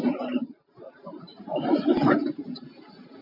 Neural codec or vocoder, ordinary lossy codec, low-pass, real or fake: none; AAC, 32 kbps; 5.4 kHz; real